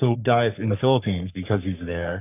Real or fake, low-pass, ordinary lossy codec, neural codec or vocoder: fake; 3.6 kHz; AAC, 32 kbps; codec, 44.1 kHz, 3.4 kbps, Pupu-Codec